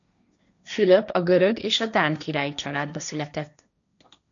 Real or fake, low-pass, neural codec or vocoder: fake; 7.2 kHz; codec, 16 kHz, 1.1 kbps, Voila-Tokenizer